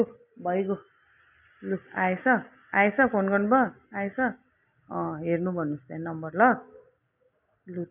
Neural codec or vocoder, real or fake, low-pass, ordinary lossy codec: none; real; 3.6 kHz; none